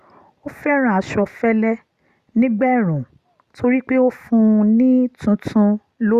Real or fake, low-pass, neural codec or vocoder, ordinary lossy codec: real; 14.4 kHz; none; Opus, 64 kbps